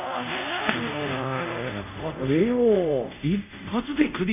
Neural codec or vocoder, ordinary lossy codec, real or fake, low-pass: codec, 24 kHz, 0.5 kbps, DualCodec; none; fake; 3.6 kHz